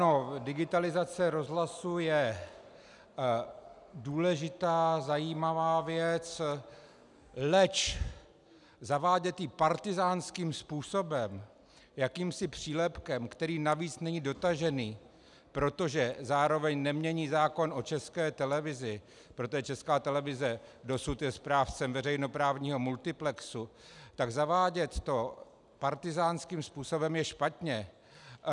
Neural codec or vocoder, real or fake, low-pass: none; real; 10.8 kHz